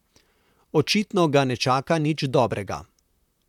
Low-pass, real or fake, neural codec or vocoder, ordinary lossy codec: 19.8 kHz; fake; vocoder, 44.1 kHz, 128 mel bands, Pupu-Vocoder; none